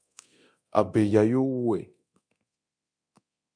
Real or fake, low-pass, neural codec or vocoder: fake; 9.9 kHz; codec, 24 kHz, 0.9 kbps, DualCodec